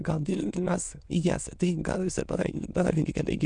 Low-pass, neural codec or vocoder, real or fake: 9.9 kHz; autoencoder, 22.05 kHz, a latent of 192 numbers a frame, VITS, trained on many speakers; fake